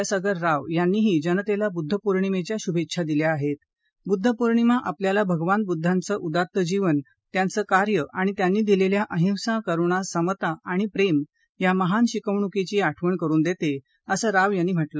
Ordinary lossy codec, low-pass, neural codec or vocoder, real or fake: none; none; none; real